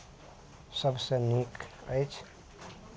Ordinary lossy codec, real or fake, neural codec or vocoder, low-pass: none; real; none; none